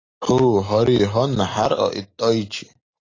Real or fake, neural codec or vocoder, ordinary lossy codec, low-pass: real; none; AAC, 32 kbps; 7.2 kHz